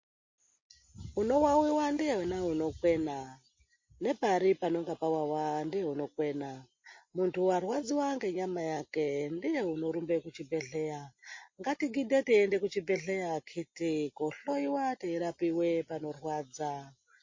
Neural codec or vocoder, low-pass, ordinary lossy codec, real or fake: none; 7.2 kHz; MP3, 32 kbps; real